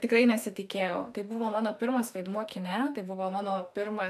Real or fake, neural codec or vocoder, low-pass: fake; autoencoder, 48 kHz, 32 numbers a frame, DAC-VAE, trained on Japanese speech; 14.4 kHz